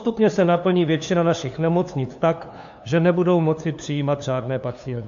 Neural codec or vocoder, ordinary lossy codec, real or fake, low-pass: codec, 16 kHz, 2 kbps, FunCodec, trained on LibriTTS, 25 frames a second; AAC, 64 kbps; fake; 7.2 kHz